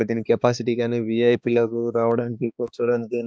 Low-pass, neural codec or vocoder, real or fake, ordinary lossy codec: none; codec, 16 kHz, 2 kbps, X-Codec, HuBERT features, trained on balanced general audio; fake; none